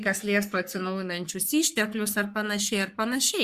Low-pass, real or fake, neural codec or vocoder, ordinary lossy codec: 14.4 kHz; fake; codec, 44.1 kHz, 3.4 kbps, Pupu-Codec; Opus, 64 kbps